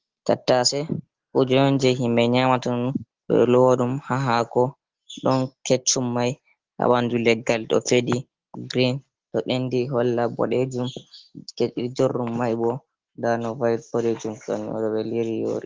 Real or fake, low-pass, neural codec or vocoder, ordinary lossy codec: real; 7.2 kHz; none; Opus, 16 kbps